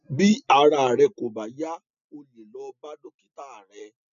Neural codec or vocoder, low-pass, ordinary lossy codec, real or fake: none; 7.2 kHz; none; real